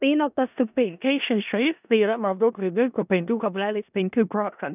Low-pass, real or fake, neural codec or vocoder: 3.6 kHz; fake; codec, 16 kHz in and 24 kHz out, 0.4 kbps, LongCat-Audio-Codec, four codebook decoder